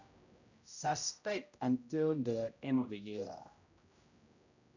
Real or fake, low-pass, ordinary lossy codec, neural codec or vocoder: fake; 7.2 kHz; none; codec, 16 kHz, 0.5 kbps, X-Codec, HuBERT features, trained on general audio